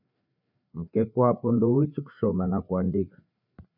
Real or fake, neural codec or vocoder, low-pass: fake; codec, 16 kHz, 4 kbps, FreqCodec, larger model; 5.4 kHz